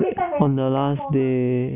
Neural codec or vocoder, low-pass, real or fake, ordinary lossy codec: none; 3.6 kHz; real; none